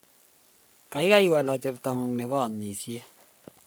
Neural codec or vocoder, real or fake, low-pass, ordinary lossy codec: codec, 44.1 kHz, 3.4 kbps, Pupu-Codec; fake; none; none